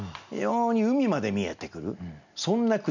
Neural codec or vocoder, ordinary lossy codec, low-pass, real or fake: none; none; 7.2 kHz; real